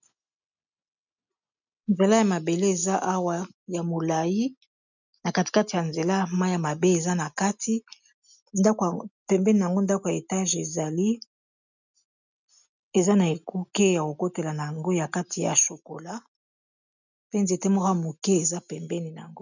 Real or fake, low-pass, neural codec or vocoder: real; 7.2 kHz; none